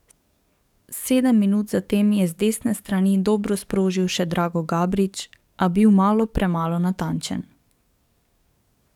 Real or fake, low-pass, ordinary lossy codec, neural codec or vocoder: fake; 19.8 kHz; none; codec, 44.1 kHz, 7.8 kbps, DAC